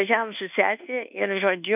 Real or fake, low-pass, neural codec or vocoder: fake; 3.6 kHz; codec, 24 kHz, 1.2 kbps, DualCodec